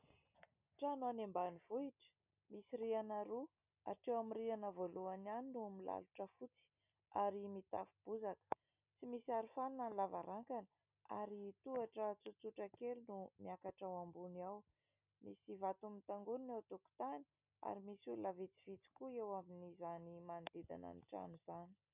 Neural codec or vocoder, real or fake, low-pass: none; real; 3.6 kHz